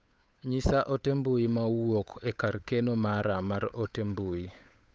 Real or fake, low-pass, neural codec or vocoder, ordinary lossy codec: fake; none; codec, 16 kHz, 8 kbps, FunCodec, trained on Chinese and English, 25 frames a second; none